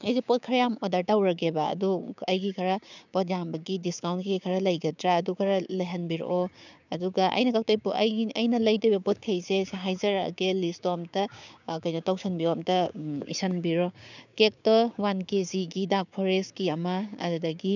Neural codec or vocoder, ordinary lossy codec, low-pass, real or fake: codec, 16 kHz, 6 kbps, DAC; none; 7.2 kHz; fake